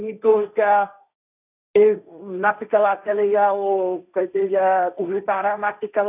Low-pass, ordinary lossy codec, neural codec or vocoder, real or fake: 3.6 kHz; none; codec, 16 kHz, 1.1 kbps, Voila-Tokenizer; fake